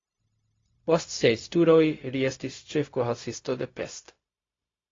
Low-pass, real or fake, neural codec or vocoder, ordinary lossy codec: 7.2 kHz; fake; codec, 16 kHz, 0.4 kbps, LongCat-Audio-Codec; AAC, 32 kbps